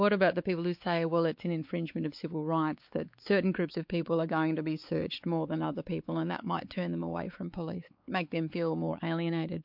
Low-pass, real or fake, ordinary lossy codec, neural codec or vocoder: 5.4 kHz; fake; MP3, 48 kbps; codec, 16 kHz, 4 kbps, X-Codec, WavLM features, trained on Multilingual LibriSpeech